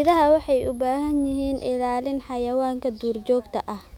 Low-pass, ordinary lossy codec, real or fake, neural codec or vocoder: 19.8 kHz; none; fake; autoencoder, 48 kHz, 128 numbers a frame, DAC-VAE, trained on Japanese speech